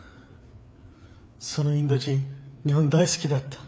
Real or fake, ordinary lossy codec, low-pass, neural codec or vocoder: fake; none; none; codec, 16 kHz, 4 kbps, FreqCodec, larger model